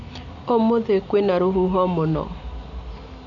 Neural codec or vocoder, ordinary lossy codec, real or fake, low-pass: none; none; real; 7.2 kHz